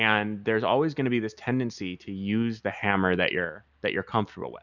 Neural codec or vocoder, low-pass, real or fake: none; 7.2 kHz; real